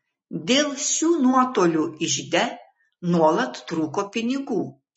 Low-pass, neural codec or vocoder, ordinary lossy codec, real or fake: 10.8 kHz; none; MP3, 32 kbps; real